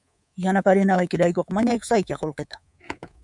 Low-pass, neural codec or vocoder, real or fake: 10.8 kHz; codec, 24 kHz, 3.1 kbps, DualCodec; fake